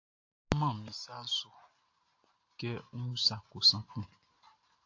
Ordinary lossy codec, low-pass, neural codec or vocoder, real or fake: AAC, 48 kbps; 7.2 kHz; none; real